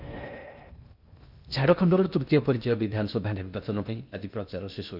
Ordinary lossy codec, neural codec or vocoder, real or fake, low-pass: none; codec, 16 kHz in and 24 kHz out, 0.8 kbps, FocalCodec, streaming, 65536 codes; fake; 5.4 kHz